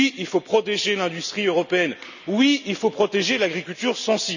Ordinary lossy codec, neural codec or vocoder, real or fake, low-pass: none; none; real; 7.2 kHz